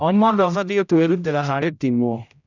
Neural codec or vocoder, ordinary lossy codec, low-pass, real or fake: codec, 16 kHz, 0.5 kbps, X-Codec, HuBERT features, trained on general audio; none; 7.2 kHz; fake